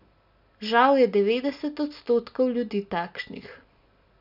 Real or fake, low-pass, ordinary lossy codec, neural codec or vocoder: real; 5.4 kHz; none; none